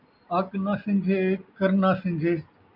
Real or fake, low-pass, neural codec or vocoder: real; 5.4 kHz; none